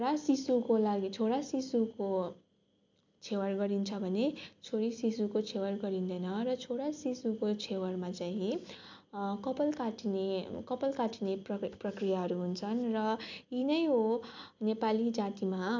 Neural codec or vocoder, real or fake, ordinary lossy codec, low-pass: none; real; MP3, 64 kbps; 7.2 kHz